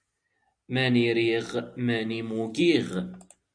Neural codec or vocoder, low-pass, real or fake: none; 9.9 kHz; real